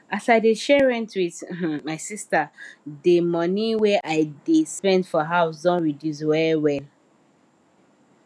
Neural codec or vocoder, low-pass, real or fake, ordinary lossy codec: none; none; real; none